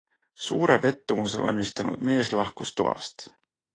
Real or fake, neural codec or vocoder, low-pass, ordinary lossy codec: fake; autoencoder, 48 kHz, 32 numbers a frame, DAC-VAE, trained on Japanese speech; 9.9 kHz; AAC, 32 kbps